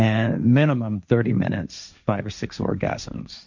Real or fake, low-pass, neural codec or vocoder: fake; 7.2 kHz; codec, 16 kHz, 1.1 kbps, Voila-Tokenizer